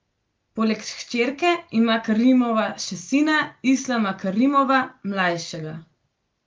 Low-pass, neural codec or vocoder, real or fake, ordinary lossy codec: 7.2 kHz; none; real; Opus, 32 kbps